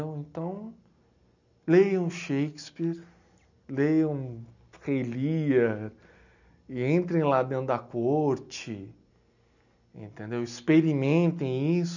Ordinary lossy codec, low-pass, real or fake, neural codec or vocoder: none; 7.2 kHz; real; none